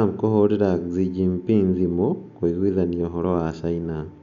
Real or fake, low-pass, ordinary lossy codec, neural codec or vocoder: real; 7.2 kHz; none; none